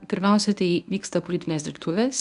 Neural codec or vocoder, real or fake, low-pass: codec, 24 kHz, 0.9 kbps, WavTokenizer, medium speech release version 1; fake; 10.8 kHz